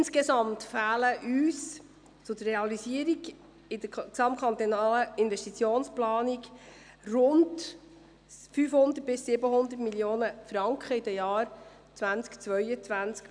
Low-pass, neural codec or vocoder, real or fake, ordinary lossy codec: 9.9 kHz; none; real; none